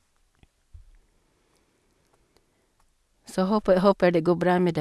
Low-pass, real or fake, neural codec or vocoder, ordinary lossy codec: none; real; none; none